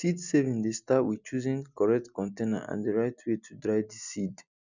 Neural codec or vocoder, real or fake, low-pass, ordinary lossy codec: none; real; 7.2 kHz; none